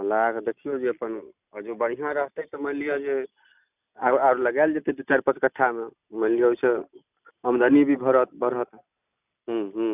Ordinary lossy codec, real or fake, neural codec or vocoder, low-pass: none; real; none; 3.6 kHz